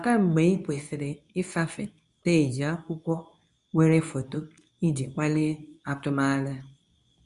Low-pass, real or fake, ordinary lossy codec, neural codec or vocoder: 10.8 kHz; fake; none; codec, 24 kHz, 0.9 kbps, WavTokenizer, medium speech release version 2